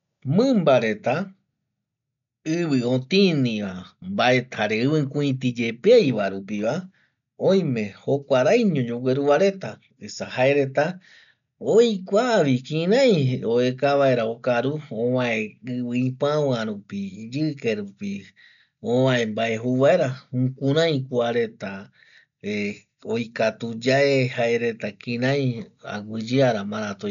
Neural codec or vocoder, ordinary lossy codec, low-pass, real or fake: none; none; 7.2 kHz; real